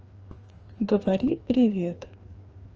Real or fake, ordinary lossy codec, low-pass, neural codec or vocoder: fake; Opus, 24 kbps; 7.2 kHz; codec, 16 kHz, 2 kbps, FreqCodec, larger model